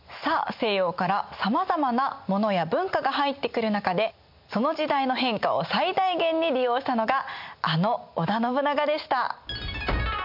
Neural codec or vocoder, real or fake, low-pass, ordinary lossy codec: none; real; 5.4 kHz; MP3, 48 kbps